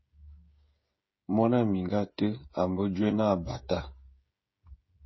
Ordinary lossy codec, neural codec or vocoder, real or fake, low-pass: MP3, 24 kbps; codec, 16 kHz, 16 kbps, FreqCodec, smaller model; fake; 7.2 kHz